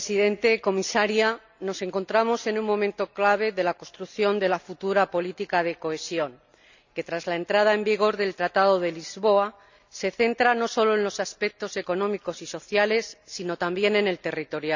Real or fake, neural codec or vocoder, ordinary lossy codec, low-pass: real; none; none; 7.2 kHz